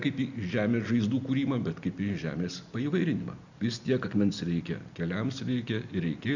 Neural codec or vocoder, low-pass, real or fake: none; 7.2 kHz; real